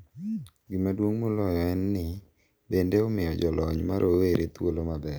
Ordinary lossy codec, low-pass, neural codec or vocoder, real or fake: none; none; none; real